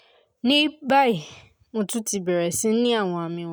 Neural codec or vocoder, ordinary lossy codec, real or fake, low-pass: none; none; real; none